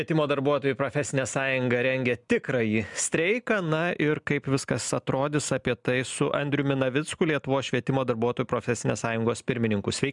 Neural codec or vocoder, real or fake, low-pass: none; real; 10.8 kHz